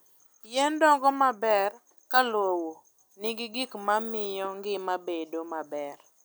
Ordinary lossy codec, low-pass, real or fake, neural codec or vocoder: none; none; real; none